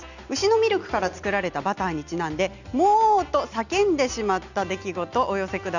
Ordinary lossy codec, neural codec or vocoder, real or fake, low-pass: none; none; real; 7.2 kHz